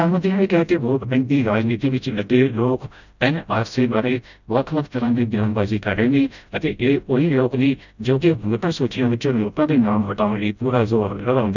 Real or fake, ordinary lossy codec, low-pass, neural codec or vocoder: fake; none; 7.2 kHz; codec, 16 kHz, 0.5 kbps, FreqCodec, smaller model